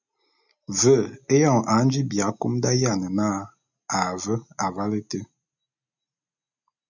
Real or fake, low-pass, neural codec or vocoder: real; 7.2 kHz; none